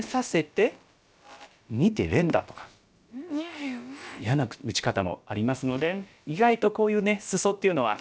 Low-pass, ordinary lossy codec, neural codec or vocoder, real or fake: none; none; codec, 16 kHz, about 1 kbps, DyCAST, with the encoder's durations; fake